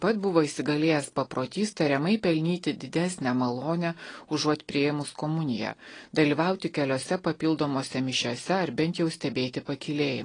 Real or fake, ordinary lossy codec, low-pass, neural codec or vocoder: real; AAC, 32 kbps; 9.9 kHz; none